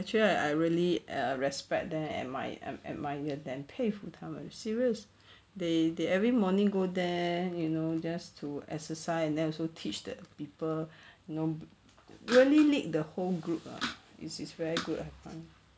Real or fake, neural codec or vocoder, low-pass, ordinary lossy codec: real; none; none; none